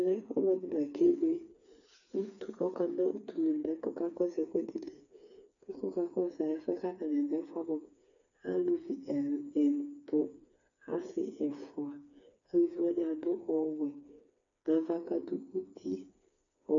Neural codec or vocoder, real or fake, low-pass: codec, 16 kHz, 4 kbps, FreqCodec, smaller model; fake; 7.2 kHz